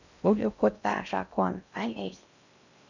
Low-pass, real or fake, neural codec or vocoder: 7.2 kHz; fake; codec, 16 kHz in and 24 kHz out, 0.6 kbps, FocalCodec, streaming, 2048 codes